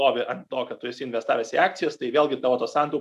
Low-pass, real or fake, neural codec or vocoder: 14.4 kHz; real; none